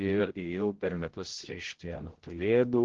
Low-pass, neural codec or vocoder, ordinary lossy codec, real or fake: 7.2 kHz; codec, 16 kHz, 0.5 kbps, X-Codec, HuBERT features, trained on general audio; Opus, 16 kbps; fake